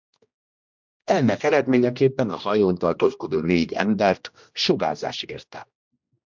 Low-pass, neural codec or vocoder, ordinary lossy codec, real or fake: 7.2 kHz; codec, 16 kHz, 1 kbps, X-Codec, HuBERT features, trained on general audio; MP3, 64 kbps; fake